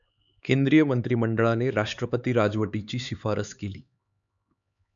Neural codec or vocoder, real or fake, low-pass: codec, 16 kHz, 4 kbps, X-Codec, HuBERT features, trained on LibriSpeech; fake; 7.2 kHz